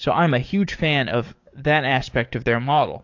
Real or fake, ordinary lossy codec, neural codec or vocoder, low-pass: fake; AAC, 48 kbps; codec, 44.1 kHz, 7.8 kbps, DAC; 7.2 kHz